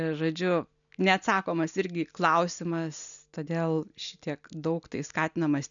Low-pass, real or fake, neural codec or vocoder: 7.2 kHz; real; none